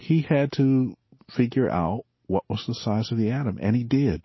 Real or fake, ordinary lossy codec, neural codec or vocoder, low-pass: real; MP3, 24 kbps; none; 7.2 kHz